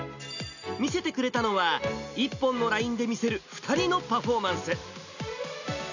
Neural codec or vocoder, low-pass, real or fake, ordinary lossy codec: none; 7.2 kHz; real; none